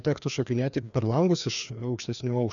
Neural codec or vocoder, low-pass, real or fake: codec, 16 kHz, 2 kbps, FreqCodec, larger model; 7.2 kHz; fake